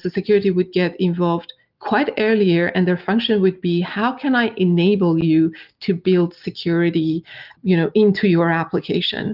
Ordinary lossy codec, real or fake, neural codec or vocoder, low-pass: Opus, 32 kbps; real; none; 5.4 kHz